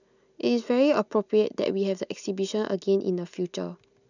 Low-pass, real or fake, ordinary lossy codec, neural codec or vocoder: 7.2 kHz; real; none; none